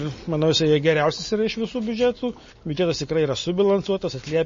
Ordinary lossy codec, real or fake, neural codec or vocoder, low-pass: MP3, 32 kbps; real; none; 7.2 kHz